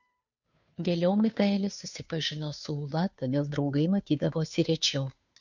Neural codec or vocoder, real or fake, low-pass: codec, 16 kHz, 2 kbps, FunCodec, trained on Chinese and English, 25 frames a second; fake; 7.2 kHz